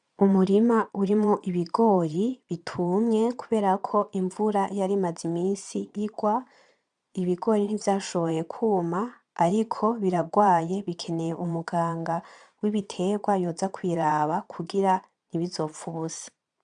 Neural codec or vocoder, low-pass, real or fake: vocoder, 22.05 kHz, 80 mel bands, Vocos; 9.9 kHz; fake